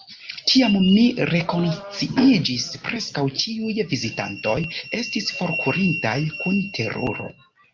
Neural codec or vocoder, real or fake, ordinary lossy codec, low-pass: none; real; Opus, 32 kbps; 7.2 kHz